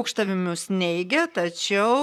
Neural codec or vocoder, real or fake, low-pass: vocoder, 44.1 kHz, 128 mel bands, Pupu-Vocoder; fake; 19.8 kHz